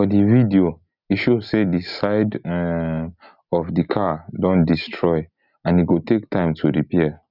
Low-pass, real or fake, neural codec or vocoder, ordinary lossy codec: 5.4 kHz; real; none; none